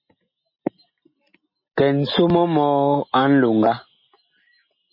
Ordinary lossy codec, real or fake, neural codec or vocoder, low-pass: MP3, 24 kbps; real; none; 5.4 kHz